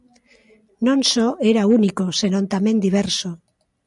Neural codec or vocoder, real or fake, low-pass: none; real; 10.8 kHz